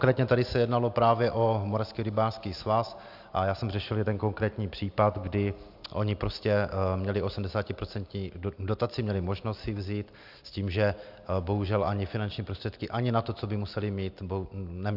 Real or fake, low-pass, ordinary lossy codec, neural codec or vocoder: real; 5.4 kHz; AAC, 48 kbps; none